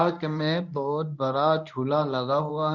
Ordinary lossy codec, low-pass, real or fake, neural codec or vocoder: none; 7.2 kHz; fake; codec, 24 kHz, 0.9 kbps, WavTokenizer, medium speech release version 1